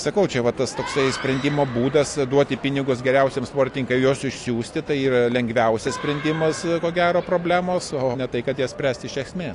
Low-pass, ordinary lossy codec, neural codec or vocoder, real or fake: 10.8 kHz; AAC, 48 kbps; none; real